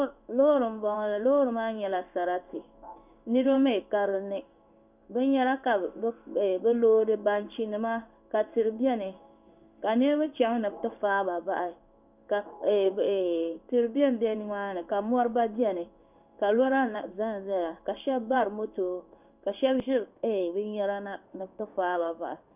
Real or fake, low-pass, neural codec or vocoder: fake; 3.6 kHz; codec, 16 kHz in and 24 kHz out, 1 kbps, XY-Tokenizer